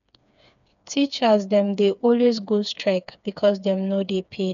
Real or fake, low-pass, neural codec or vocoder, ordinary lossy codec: fake; 7.2 kHz; codec, 16 kHz, 4 kbps, FreqCodec, smaller model; none